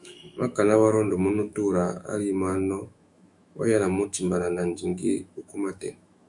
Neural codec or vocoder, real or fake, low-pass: autoencoder, 48 kHz, 128 numbers a frame, DAC-VAE, trained on Japanese speech; fake; 10.8 kHz